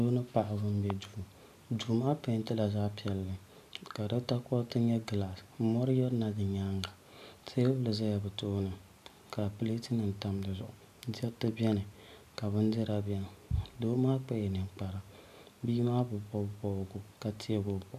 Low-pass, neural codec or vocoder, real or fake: 14.4 kHz; none; real